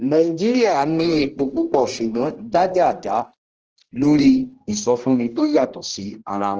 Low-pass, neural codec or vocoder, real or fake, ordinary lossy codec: 7.2 kHz; codec, 16 kHz, 1 kbps, X-Codec, HuBERT features, trained on general audio; fake; Opus, 16 kbps